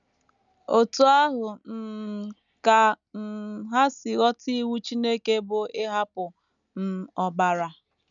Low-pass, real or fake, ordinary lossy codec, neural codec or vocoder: 7.2 kHz; real; none; none